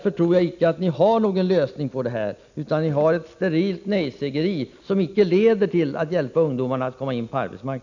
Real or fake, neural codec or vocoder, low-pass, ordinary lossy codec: real; none; 7.2 kHz; none